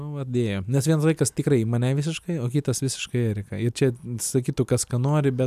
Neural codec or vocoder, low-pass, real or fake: none; 14.4 kHz; real